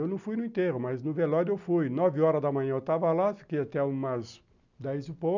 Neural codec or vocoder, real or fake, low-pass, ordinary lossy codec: none; real; 7.2 kHz; none